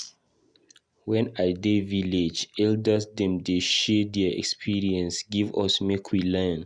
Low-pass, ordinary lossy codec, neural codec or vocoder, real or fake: 9.9 kHz; Opus, 64 kbps; none; real